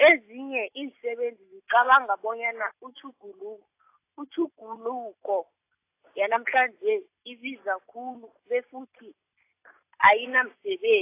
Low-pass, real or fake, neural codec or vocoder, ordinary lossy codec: 3.6 kHz; real; none; AAC, 24 kbps